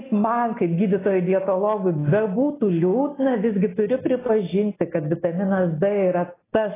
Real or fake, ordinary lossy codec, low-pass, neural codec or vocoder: real; AAC, 16 kbps; 3.6 kHz; none